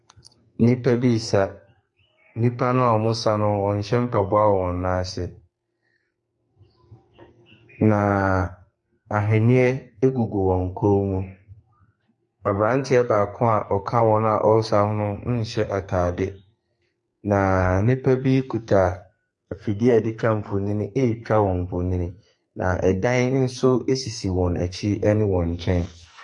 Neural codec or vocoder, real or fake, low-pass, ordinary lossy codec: codec, 44.1 kHz, 2.6 kbps, SNAC; fake; 10.8 kHz; MP3, 48 kbps